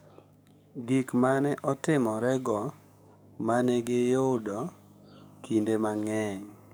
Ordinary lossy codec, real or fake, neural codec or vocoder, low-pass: none; fake; codec, 44.1 kHz, 7.8 kbps, DAC; none